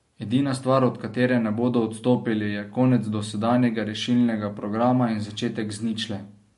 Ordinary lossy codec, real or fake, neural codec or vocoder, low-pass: MP3, 48 kbps; real; none; 14.4 kHz